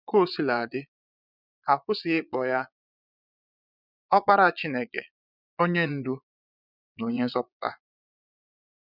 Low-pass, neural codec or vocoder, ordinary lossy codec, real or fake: 5.4 kHz; vocoder, 22.05 kHz, 80 mel bands, Vocos; none; fake